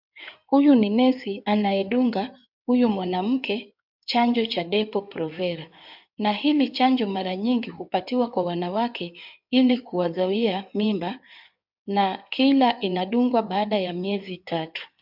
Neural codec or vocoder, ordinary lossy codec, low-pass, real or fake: codec, 16 kHz in and 24 kHz out, 2.2 kbps, FireRedTTS-2 codec; MP3, 48 kbps; 5.4 kHz; fake